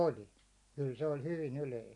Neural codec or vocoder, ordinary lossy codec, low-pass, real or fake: none; none; 10.8 kHz; real